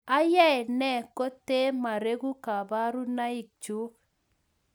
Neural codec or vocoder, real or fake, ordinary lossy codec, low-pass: none; real; none; none